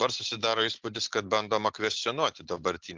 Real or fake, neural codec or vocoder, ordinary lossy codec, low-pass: real; none; Opus, 16 kbps; 7.2 kHz